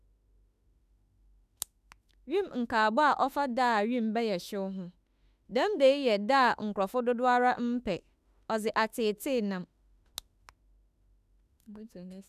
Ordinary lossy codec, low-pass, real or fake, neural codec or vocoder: none; 14.4 kHz; fake; autoencoder, 48 kHz, 32 numbers a frame, DAC-VAE, trained on Japanese speech